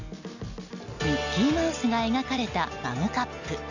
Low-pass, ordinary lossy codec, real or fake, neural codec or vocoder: 7.2 kHz; none; real; none